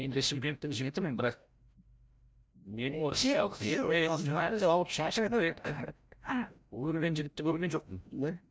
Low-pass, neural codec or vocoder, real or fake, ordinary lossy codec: none; codec, 16 kHz, 0.5 kbps, FreqCodec, larger model; fake; none